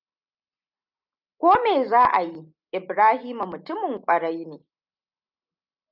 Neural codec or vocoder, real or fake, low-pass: none; real; 5.4 kHz